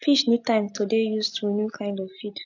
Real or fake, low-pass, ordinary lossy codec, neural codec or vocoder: real; 7.2 kHz; AAC, 48 kbps; none